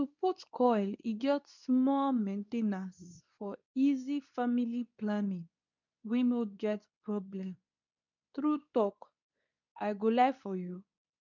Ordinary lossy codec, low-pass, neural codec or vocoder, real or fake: none; 7.2 kHz; codec, 24 kHz, 0.9 kbps, WavTokenizer, medium speech release version 2; fake